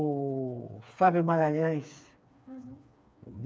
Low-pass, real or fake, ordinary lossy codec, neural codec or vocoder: none; fake; none; codec, 16 kHz, 4 kbps, FreqCodec, smaller model